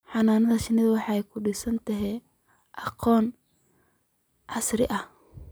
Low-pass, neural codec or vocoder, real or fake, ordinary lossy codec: none; none; real; none